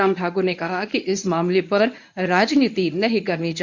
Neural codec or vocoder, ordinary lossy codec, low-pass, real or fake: codec, 24 kHz, 0.9 kbps, WavTokenizer, medium speech release version 1; none; 7.2 kHz; fake